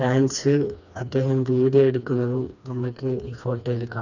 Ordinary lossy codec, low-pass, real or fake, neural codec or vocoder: none; 7.2 kHz; fake; codec, 16 kHz, 2 kbps, FreqCodec, smaller model